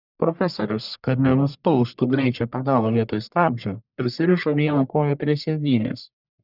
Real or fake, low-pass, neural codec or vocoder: fake; 5.4 kHz; codec, 44.1 kHz, 1.7 kbps, Pupu-Codec